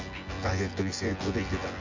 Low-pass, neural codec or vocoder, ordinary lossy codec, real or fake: 7.2 kHz; vocoder, 24 kHz, 100 mel bands, Vocos; Opus, 32 kbps; fake